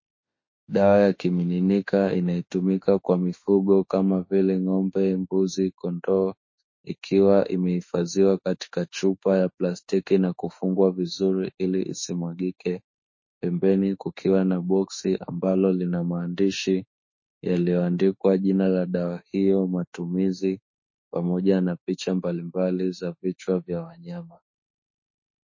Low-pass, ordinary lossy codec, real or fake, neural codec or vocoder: 7.2 kHz; MP3, 32 kbps; fake; autoencoder, 48 kHz, 32 numbers a frame, DAC-VAE, trained on Japanese speech